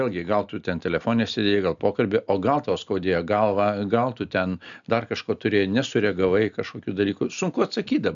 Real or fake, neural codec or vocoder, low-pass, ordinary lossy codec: real; none; 7.2 kHz; MP3, 96 kbps